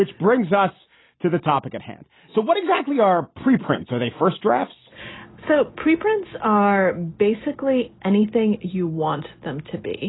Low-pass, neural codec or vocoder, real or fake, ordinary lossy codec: 7.2 kHz; none; real; AAC, 16 kbps